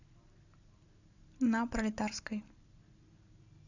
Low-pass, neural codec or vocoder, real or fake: 7.2 kHz; none; real